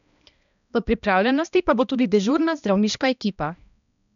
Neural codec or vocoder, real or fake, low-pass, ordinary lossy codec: codec, 16 kHz, 1 kbps, X-Codec, HuBERT features, trained on balanced general audio; fake; 7.2 kHz; none